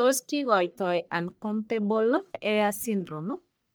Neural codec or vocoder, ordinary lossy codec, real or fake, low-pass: codec, 44.1 kHz, 1.7 kbps, Pupu-Codec; none; fake; none